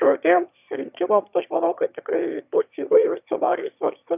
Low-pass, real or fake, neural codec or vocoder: 3.6 kHz; fake; autoencoder, 22.05 kHz, a latent of 192 numbers a frame, VITS, trained on one speaker